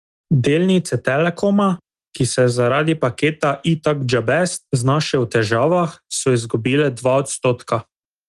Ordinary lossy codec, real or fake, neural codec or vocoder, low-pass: Opus, 24 kbps; real; none; 10.8 kHz